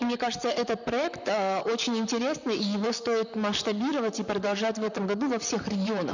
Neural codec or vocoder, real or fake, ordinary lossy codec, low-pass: vocoder, 44.1 kHz, 128 mel bands, Pupu-Vocoder; fake; none; 7.2 kHz